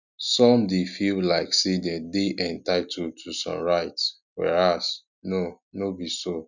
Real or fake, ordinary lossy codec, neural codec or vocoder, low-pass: real; none; none; 7.2 kHz